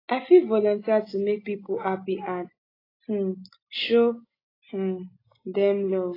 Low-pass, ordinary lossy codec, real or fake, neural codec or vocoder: 5.4 kHz; AAC, 24 kbps; real; none